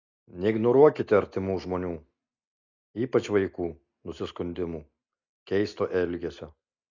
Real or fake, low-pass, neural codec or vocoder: real; 7.2 kHz; none